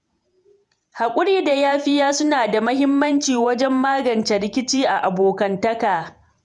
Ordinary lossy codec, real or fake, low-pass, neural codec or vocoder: none; fake; 10.8 kHz; vocoder, 48 kHz, 128 mel bands, Vocos